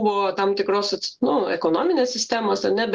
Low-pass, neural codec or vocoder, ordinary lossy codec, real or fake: 7.2 kHz; none; Opus, 16 kbps; real